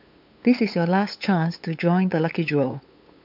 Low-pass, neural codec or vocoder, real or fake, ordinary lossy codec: 5.4 kHz; codec, 16 kHz, 8 kbps, FunCodec, trained on LibriTTS, 25 frames a second; fake; MP3, 48 kbps